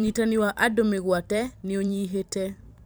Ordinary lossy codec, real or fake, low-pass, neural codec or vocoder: none; fake; none; vocoder, 44.1 kHz, 128 mel bands every 512 samples, BigVGAN v2